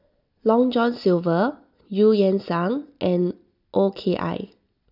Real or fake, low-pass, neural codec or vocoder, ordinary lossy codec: real; 5.4 kHz; none; none